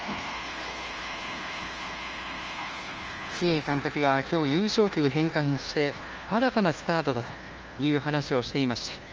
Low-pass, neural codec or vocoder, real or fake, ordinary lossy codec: 7.2 kHz; codec, 16 kHz, 1 kbps, FunCodec, trained on LibriTTS, 50 frames a second; fake; Opus, 24 kbps